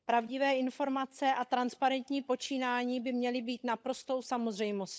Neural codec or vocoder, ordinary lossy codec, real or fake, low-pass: codec, 16 kHz, 16 kbps, FunCodec, trained on LibriTTS, 50 frames a second; none; fake; none